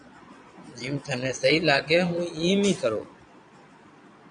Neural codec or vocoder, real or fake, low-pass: vocoder, 22.05 kHz, 80 mel bands, Vocos; fake; 9.9 kHz